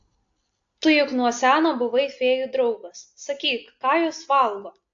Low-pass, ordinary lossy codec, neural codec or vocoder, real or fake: 7.2 kHz; AAC, 48 kbps; none; real